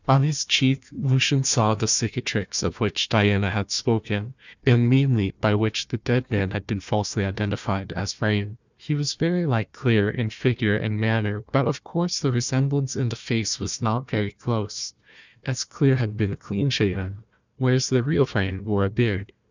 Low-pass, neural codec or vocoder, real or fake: 7.2 kHz; codec, 16 kHz, 1 kbps, FunCodec, trained on Chinese and English, 50 frames a second; fake